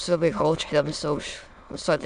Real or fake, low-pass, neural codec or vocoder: fake; 9.9 kHz; autoencoder, 22.05 kHz, a latent of 192 numbers a frame, VITS, trained on many speakers